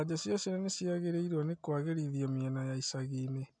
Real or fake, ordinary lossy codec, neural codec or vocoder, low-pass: real; MP3, 96 kbps; none; 9.9 kHz